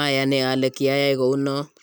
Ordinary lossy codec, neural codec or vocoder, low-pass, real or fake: none; none; none; real